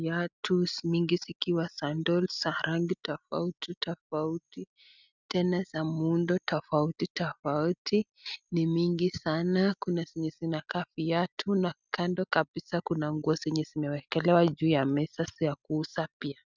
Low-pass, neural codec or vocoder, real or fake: 7.2 kHz; none; real